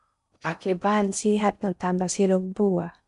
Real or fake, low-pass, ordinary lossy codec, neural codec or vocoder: fake; 10.8 kHz; none; codec, 16 kHz in and 24 kHz out, 0.6 kbps, FocalCodec, streaming, 4096 codes